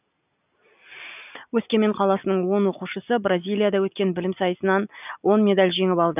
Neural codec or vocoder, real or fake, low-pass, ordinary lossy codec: none; real; 3.6 kHz; none